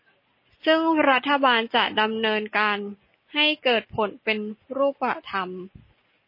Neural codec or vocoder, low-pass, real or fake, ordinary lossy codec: none; 5.4 kHz; real; MP3, 24 kbps